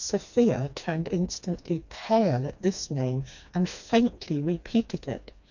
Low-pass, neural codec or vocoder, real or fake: 7.2 kHz; codec, 16 kHz, 2 kbps, FreqCodec, smaller model; fake